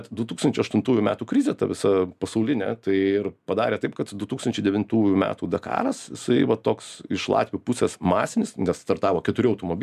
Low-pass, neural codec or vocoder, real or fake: 14.4 kHz; none; real